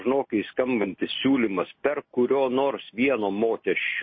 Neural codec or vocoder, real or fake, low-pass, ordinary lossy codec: none; real; 7.2 kHz; MP3, 24 kbps